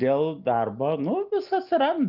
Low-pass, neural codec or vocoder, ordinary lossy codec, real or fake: 5.4 kHz; none; Opus, 24 kbps; real